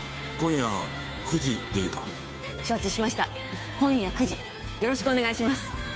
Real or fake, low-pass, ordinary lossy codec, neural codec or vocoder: fake; none; none; codec, 16 kHz, 2 kbps, FunCodec, trained on Chinese and English, 25 frames a second